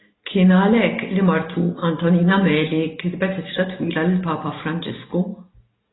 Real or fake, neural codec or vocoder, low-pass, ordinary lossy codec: real; none; 7.2 kHz; AAC, 16 kbps